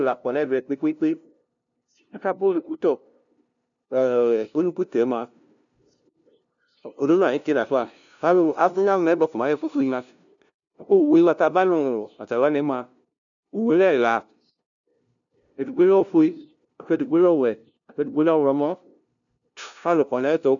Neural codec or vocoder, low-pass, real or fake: codec, 16 kHz, 0.5 kbps, FunCodec, trained on LibriTTS, 25 frames a second; 7.2 kHz; fake